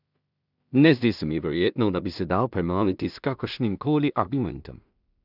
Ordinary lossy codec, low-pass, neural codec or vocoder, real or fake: none; 5.4 kHz; codec, 16 kHz in and 24 kHz out, 0.4 kbps, LongCat-Audio-Codec, two codebook decoder; fake